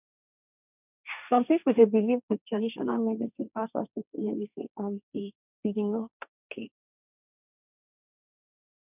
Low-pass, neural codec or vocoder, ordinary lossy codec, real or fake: 3.6 kHz; codec, 16 kHz, 1.1 kbps, Voila-Tokenizer; none; fake